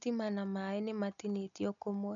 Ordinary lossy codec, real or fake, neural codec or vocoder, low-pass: none; real; none; 7.2 kHz